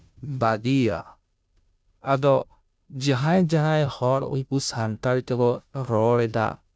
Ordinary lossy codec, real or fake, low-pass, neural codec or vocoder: none; fake; none; codec, 16 kHz, 0.5 kbps, FunCodec, trained on Chinese and English, 25 frames a second